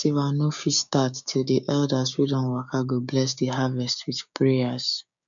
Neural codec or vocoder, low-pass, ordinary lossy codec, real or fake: codec, 16 kHz, 6 kbps, DAC; 7.2 kHz; none; fake